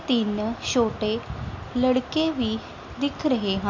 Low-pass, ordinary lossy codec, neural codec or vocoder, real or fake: 7.2 kHz; MP3, 48 kbps; none; real